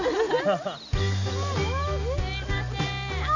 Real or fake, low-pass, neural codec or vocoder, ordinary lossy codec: real; 7.2 kHz; none; none